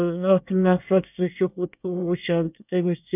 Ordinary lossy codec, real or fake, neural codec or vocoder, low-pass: none; fake; codec, 24 kHz, 1 kbps, SNAC; 3.6 kHz